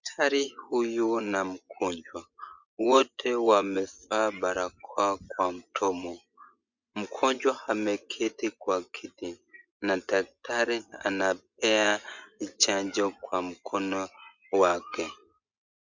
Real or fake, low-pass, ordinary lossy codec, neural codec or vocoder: fake; 7.2 kHz; Opus, 64 kbps; vocoder, 24 kHz, 100 mel bands, Vocos